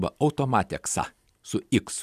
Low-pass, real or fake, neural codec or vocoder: 14.4 kHz; fake; vocoder, 44.1 kHz, 128 mel bands every 256 samples, BigVGAN v2